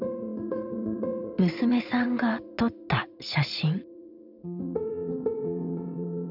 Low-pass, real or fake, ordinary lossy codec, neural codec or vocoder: 5.4 kHz; fake; none; vocoder, 22.05 kHz, 80 mel bands, WaveNeXt